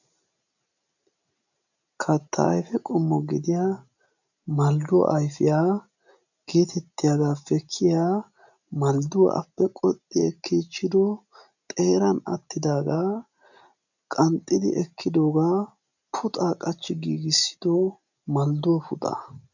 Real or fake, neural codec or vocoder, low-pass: real; none; 7.2 kHz